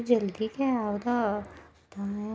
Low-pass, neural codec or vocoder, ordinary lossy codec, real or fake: none; none; none; real